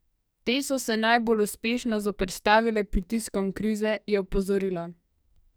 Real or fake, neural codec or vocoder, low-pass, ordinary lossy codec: fake; codec, 44.1 kHz, 2.6 kbps, SNAC; none; none